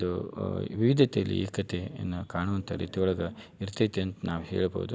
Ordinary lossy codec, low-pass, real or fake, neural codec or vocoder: none; none; real; none